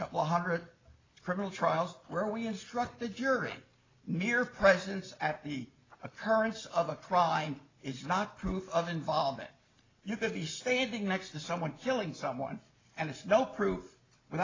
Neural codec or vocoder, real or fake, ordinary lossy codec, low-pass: none; real; AAC, 32 kbps; 7.2 kHz